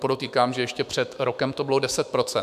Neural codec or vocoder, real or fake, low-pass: autoencoder, 48 kHz, 128 numbers a frame, DAC-VAE, trained on Japanese speech; fake; 14.4 kHz